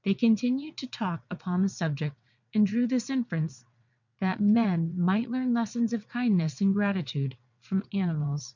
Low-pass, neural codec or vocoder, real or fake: 7.2 kHz; vocoder, 22.05 kHz, 80 mel bands, WaveNeXt; fake